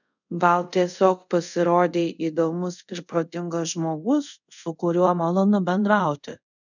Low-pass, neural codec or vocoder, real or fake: 7.2 kHz; codec, 24 kHz, 0.5 kbps, DualCodec; fake